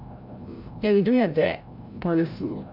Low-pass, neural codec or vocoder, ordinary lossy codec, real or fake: 5.4 kHz; codec, 16 kHz, 0.5 kbps, FreqCodec, larger model; AAC, 48 kbps; fake